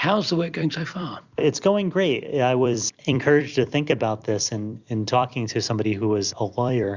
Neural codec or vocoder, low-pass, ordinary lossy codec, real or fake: vocoder, 44.1 kHz, 128 mel bands every 256 samples, BigVGAN v2; 7.2 kHz; Opus, 64 kbps; fake